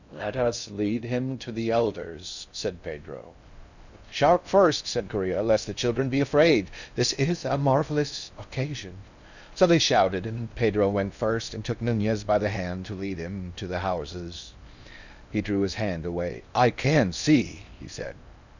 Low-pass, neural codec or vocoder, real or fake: 7.2 kHz; codec, 16 kHz in and 24 kHz out, 0.6 kbps, FocalCodec, streaming, 2048 codes; fake